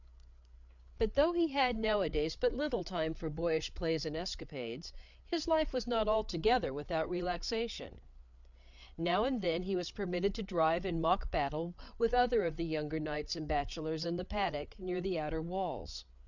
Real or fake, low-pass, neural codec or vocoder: fake; 7.2 kHz; codec, 16 kHz, 8 kbps, FreqCodec, larger model